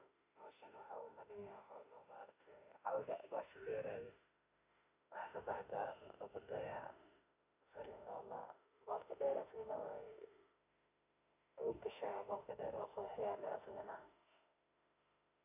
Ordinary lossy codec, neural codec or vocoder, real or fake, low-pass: none; autoencoder, 48 kHz, 32 numbers a frame, DAC-VAE, trained on Japanese speech; fake; 3.6 kHz